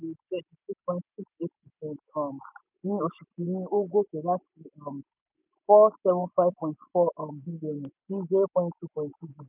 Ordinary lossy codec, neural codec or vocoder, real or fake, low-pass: none; none; real; 3.6 kHz